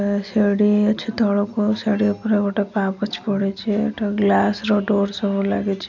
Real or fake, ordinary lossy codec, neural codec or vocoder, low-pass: real; none; none; 7.2 kHz